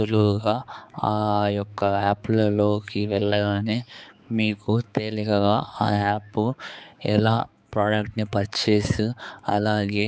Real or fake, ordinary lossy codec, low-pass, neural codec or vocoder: fake; none; none; codec, 16 kHz, 4 kbps, X-Codec, HuBERT features, trained on balanced general audio